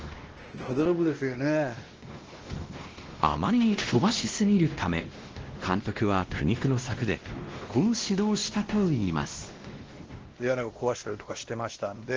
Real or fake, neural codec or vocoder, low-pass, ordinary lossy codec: fake; codec, 16 kHz, 1 kbps, X-Codec, WavLM features, trained on Multilingual LibriSpeech; 7.2 kHz; Opus, 16 kbps